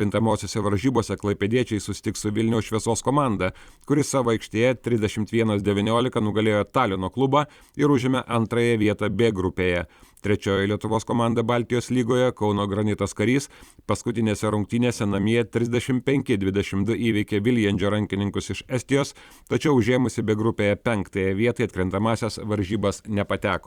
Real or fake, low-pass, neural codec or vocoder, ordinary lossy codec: fake; 19.8 kHz; vocoder, 44.1 kHz, 128 mel bands every 256 samples, BigVGAN v2; Opus, 64 kbps